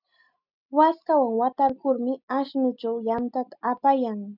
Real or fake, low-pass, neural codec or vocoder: real; 5.4 kHz; none